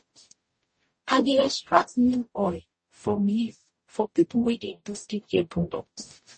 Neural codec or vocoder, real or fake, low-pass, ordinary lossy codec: codec, 44.1 kHz, 0.9 kbps, DAC; fake; 9.9 kHz; MP3, 32 kbps